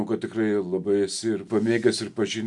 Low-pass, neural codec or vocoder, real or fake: 10.8 kHz; none; real